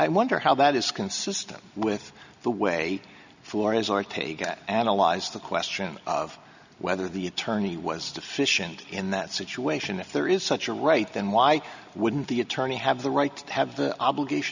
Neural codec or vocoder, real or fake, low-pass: none; real; 7.2 kHz